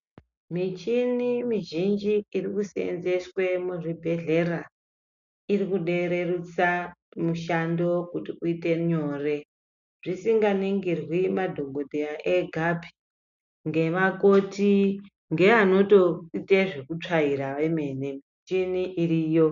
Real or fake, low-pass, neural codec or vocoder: real; 7.2 kHz; none